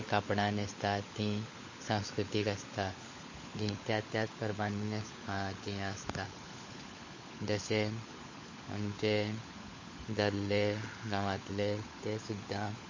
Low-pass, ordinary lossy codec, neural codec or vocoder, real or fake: 7.2 kHz; MP3, 32 kbps; codec, 16 kHz, 16 kbps, FunCodec, trained on LibriTTS, 50 frames a second; fake